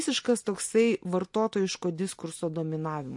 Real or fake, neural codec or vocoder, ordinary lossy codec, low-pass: real; none; MP3, 48 kbps; 10.8 kHz